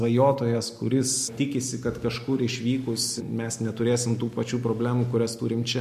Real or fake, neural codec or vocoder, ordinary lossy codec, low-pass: real; none; MP3, 64 kbps; 14.4 kHz